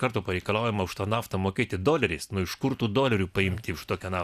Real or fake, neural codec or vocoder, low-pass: real; none; 14.4 kHz